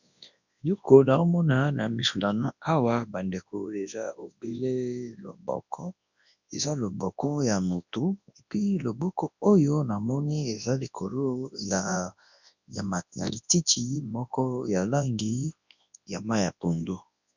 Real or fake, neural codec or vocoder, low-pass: fake; codec, 24 kHz, 0.9 kbps, WavTokenizer, large speech release; 7.2 kHz